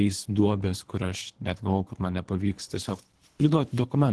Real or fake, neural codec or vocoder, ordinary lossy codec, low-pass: fake; codec, 24 kHz, 3 kbps, HILCodec; Opus, 16 kbps; 10.8 kHz